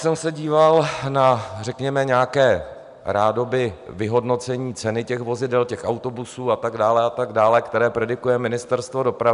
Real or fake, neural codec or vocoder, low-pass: real; none; 10.8 kHz